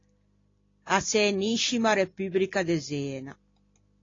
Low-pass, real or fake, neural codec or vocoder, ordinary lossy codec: 7.2 kHz; real; none; AAC, 32 kbps